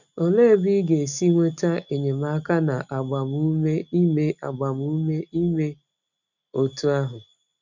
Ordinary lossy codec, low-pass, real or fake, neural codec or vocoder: none; 7.2 kHz; real; none